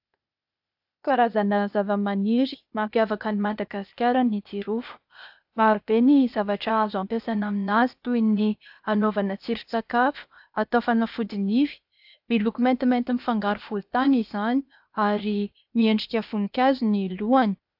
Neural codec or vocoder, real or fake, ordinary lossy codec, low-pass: codec, 16 kHz, 0.8 kbps, ZipCodec; fake; AAC, 48 kbps; 5.4 kHz